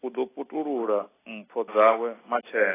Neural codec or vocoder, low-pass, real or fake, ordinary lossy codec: none; 3.6 kHz; real; AAC, 16 kbps